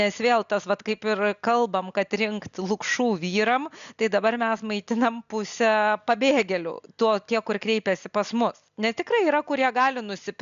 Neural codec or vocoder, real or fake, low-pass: none; real; 7.2 kHz